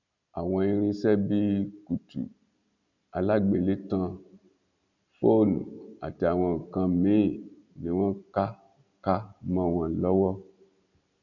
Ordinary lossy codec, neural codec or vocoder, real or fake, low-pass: none; none; real; 7.2 kHz